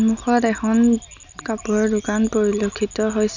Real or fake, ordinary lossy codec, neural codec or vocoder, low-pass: real; none; none; 7.2 kHz